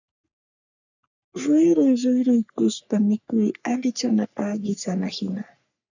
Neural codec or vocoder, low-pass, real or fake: codec, 44.1 kHz, 3.4 kbps, Pupu-Codec; 7.2 kHz; fake